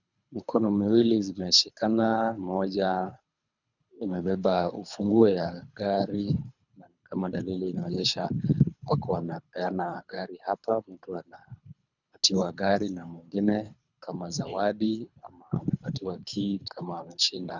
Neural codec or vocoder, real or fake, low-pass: codec, 24 kHz, 3 kbps, HILCodec; fake; 7.2 kHz